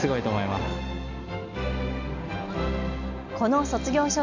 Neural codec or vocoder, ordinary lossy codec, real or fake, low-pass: none; none; real; 7.2 kHz